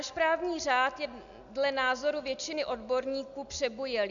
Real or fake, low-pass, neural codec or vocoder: real; 7.2 kHz; none